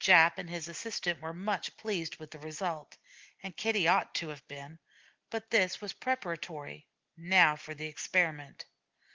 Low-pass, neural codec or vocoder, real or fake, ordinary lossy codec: 7.2 kHz; none; real; Opus, 16 kbps